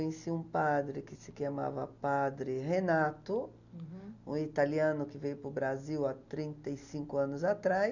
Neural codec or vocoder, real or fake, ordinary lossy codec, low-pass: none; real; none; 7.2 kHz